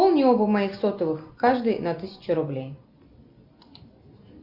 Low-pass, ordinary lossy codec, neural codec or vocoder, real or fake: 5.4 kHz; Opus, 64 kbps; none; real